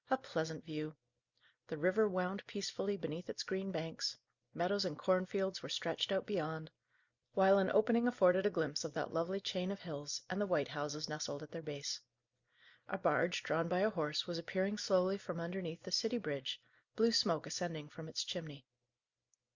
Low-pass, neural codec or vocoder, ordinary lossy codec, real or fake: 7.2 kHz; none; Opus, 64 kbps; real